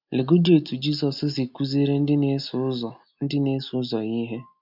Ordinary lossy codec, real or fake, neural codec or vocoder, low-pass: none; real; none; 5.4 kHz